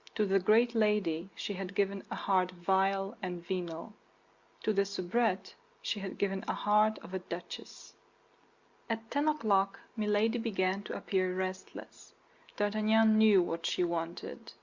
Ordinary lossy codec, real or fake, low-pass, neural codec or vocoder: Opus, 64 kbps; real; 7.2 kHz; none